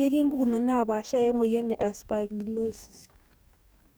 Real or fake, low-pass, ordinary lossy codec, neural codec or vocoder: fake; none; none; codec, 44.1 kHz, 2.6 kbps, DAC